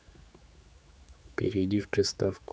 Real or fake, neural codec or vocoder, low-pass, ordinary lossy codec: fake; codec, 16 kHz, 4 kbps, X-Codec, HuBERT features, trained on general audio; none; none